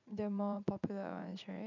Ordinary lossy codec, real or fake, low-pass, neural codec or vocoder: none; fake; 7.2 kHz; vocoder, 44.1 kHz, 80 mel bands, Vocos